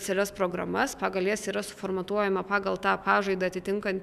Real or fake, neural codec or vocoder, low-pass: fake; autoencoder, 48 kHz, 128 numbers a frame, DAC-VAE, trained on Japanese speech; 14.4 kHz